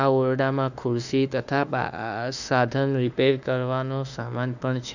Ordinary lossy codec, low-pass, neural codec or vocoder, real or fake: none; 7.2 kHz; autoencoder, 48 kHz, 32 numbers a frame, DAC-VAE, trained on Japanese speech; fake